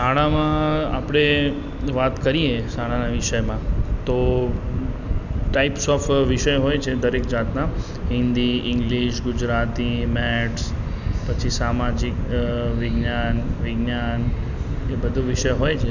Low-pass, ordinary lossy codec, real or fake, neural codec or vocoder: 7.2 kHz; none; real; none